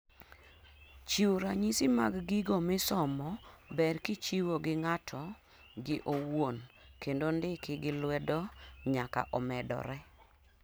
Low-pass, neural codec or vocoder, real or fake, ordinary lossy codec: none; none; real; none